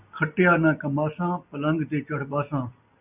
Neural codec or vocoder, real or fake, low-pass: none; real; 3.6 kHz